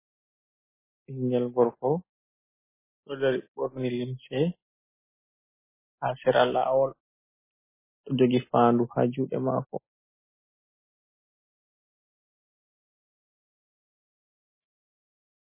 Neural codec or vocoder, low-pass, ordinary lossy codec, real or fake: none; 3.6 kHz; MP3, 16 kbps; real